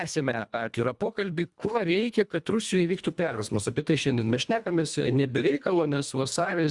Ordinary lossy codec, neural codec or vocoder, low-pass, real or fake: Opus, 64 kbps; codec, 24 kHz, 1.5 kbps, HILCodec; 10.8 kHz; fake